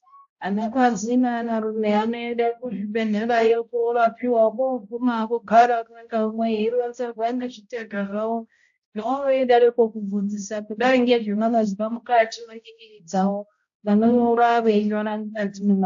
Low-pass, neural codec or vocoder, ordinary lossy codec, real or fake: 7.2 kHz; codec, 16 kHz, 0.5 kbps, X-Codec, HuBERT features, trained on balanced general audio; AAC, 64 kbps; fake